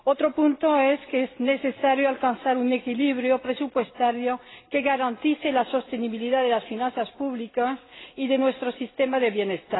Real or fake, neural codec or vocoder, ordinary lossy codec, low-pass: real; none; AAC, 16 kbps; 7.2 kHz